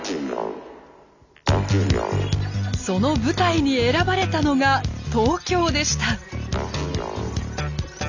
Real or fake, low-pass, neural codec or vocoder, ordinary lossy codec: real; 7.2 kHz; none; none